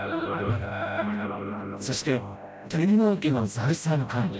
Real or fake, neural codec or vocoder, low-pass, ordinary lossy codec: fake; codec, 16 kHz, 0.5 kbps, FreqCodec, smaller model; none; none